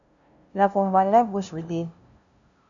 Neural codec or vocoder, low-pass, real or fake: codec, 16 kHz, 0.5 kbps, FunCodec, trained on LibriTTS, 25 frames a second; 7.2 kHz; fake